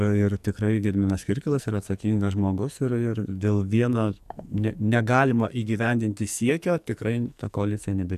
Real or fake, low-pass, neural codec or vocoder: fake; 14.4 kHz; codec, 44.1 kHz, 2.6 kbps, SNAC